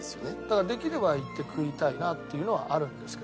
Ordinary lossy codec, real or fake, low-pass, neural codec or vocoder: none; real; none; none